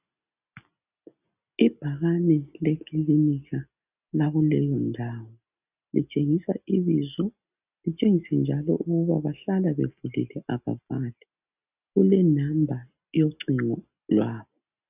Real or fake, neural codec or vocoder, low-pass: real; none; 3.6 kHz